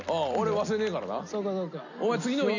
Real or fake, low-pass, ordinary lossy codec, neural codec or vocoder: real; 7.2 kHz; none; none